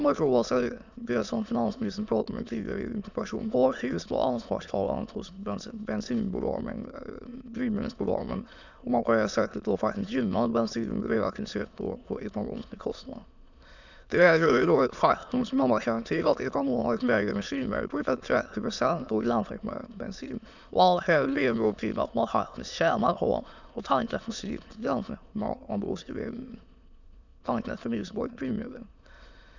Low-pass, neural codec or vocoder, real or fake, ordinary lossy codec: 7.2 kHz; autoencoder, 22.05 kHz, a latent of 192 numbers a frame, VITS, trained on many speakers; fake; none